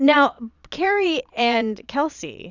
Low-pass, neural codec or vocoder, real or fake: 7.2 kHz; vocoder, 22.05 kHz, 80 mel bands, Vocos; fake